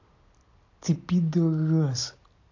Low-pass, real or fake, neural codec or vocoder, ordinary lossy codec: 7.2 kHz; real; none; AAC, 48 kbps